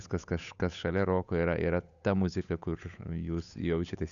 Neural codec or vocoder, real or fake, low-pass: codec, 16 kHz, 8 kbps, FunCodec, trained on Chinese and English, 25 frames a second; fake; 7.2 kHz